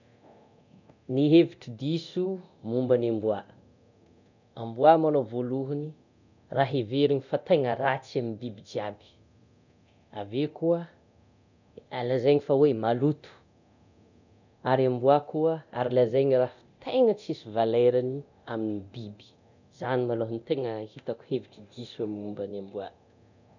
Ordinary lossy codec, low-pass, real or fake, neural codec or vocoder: none; 7.2 kHz; fake; codec, 24 kHz, 0.9 kbps, DualCodec